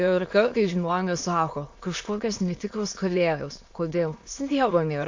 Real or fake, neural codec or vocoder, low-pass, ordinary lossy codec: fake; autoencoder, 22.05 kHz, a latent of 192 numbers a frame, VITS, trained on many speakers; 7.2 kHz; AAC, 48 kbps